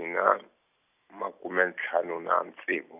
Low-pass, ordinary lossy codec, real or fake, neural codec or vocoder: 3.6 kHz; none; real; none